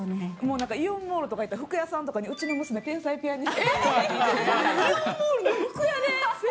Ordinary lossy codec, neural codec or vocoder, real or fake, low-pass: none; none; real; none